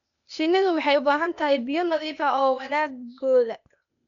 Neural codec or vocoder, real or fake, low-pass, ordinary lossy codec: codec, 16 kHz, 0.8 kbps, ZipCodec; fake; 7.2 kHz; none